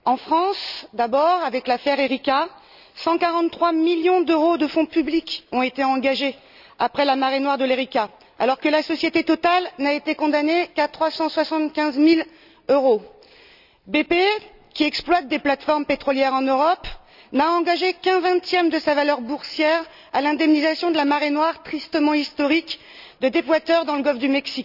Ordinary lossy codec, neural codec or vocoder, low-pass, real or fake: none; none; 5.4 kHz; real